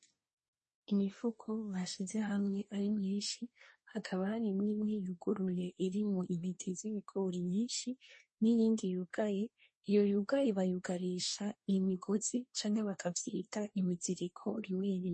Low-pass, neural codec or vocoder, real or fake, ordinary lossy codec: 10.8 kHz; codec, 24 kHz, 1 kbps, SNAC; fake; MP3, 32 kbps